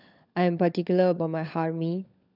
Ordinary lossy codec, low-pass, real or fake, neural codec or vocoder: none; 5.4 kHz; fake; codec, 16 kHz, 16 kbps, FunCodec, trained on LibriTTS, 50 frames a second